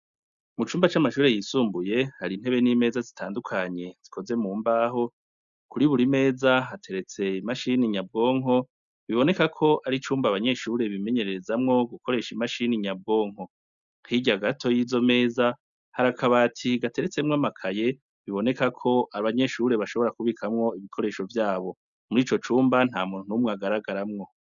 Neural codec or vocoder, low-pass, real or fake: none; 7.2 kHz; real